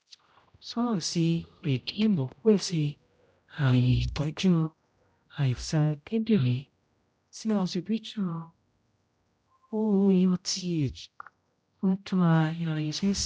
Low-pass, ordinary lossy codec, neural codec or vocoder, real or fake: none; none; codec, 16 kHz, 0.5 kbps, X-Codec, HuBERT features, trained on general audio; fake